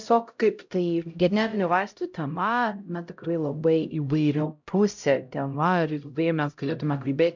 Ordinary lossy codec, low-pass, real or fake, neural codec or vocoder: MP3, 64 kbps; 7.2 kHz; fake; codec, 16 kHz, 0.5 kbps, X-Codec, HuBERT features, trained on LibriSpeech